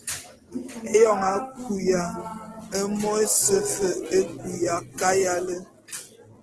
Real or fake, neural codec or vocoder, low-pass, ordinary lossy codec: real; none; 10.8 kHz; Opus, 16 kbps